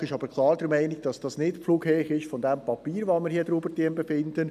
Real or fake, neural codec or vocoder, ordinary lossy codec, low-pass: real; none; none; 14.4 kHz